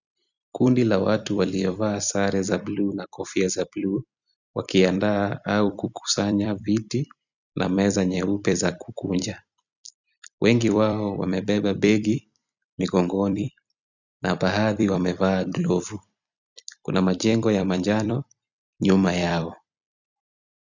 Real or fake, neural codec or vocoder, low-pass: fake; vocoder, 44.1 kHz, 128 mel bands every 256 samples, BigVGAN v2; 7.2 kHz